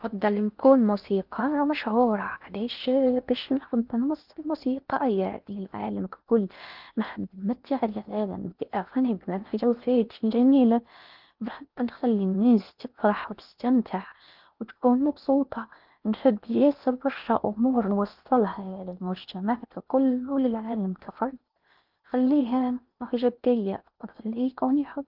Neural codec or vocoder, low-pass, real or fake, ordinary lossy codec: codec, 16 kHz in and 24 kHz out, 0.8 kbps, FocalCodec, streaming, 65536 codes; 5.4 kHz; fake; Opus, 24 kbps